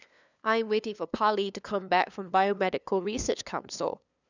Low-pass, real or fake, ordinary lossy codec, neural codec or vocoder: 7.2 kHz; fake; none; codec, 16 kHz, 2 kbps, FunCodec, trained on LibriTTS, 25 frames a second